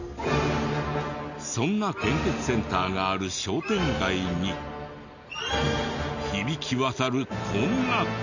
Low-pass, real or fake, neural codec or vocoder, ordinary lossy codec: 7.2 kHz; real; none; none